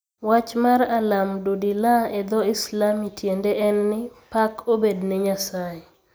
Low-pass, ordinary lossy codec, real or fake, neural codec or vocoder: none; none; real; none